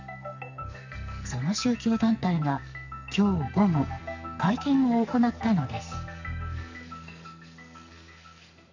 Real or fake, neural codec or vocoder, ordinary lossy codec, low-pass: fake; codec, 44.1 kHz, 2.6 kbps, SNAC; none; 7.2 kHz